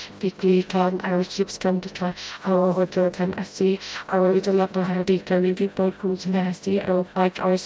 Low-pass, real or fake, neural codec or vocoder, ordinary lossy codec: none; fake; codec, 16 kHz, 0.5 kbps, FreqCodec, smaller model; none